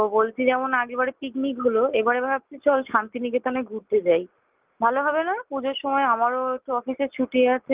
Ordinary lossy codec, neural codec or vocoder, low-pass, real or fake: Opus, 32 kbps; none; 3.6 kHz; real